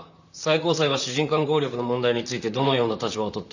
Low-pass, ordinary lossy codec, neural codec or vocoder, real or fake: 7.2 kHz; none; vocoder, 44.1 kHz, 128 mel bands, Pupu-Vocoder; fake